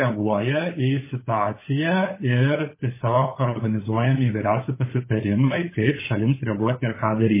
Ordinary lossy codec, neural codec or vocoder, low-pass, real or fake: MP3, 16 kbps; codec, 16 kHz, 16 kbps, FunCodec, trained on LibriTTS, 50 frames a second; 3.6 kHz; fake